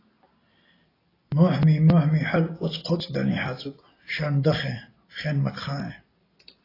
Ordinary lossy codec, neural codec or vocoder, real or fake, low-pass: AAC, 24 kbps; none; real; 5.4 kHz